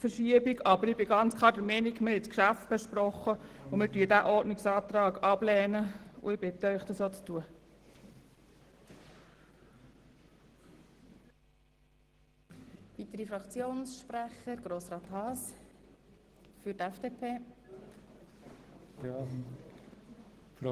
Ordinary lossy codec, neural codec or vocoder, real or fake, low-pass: Opus, 16 kbps; none; real; 14.4 kHz